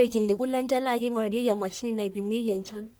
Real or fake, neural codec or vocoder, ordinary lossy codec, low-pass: fake; codec, 44.1 kHz, 1.7 kbps, Pupu-Codec; none; none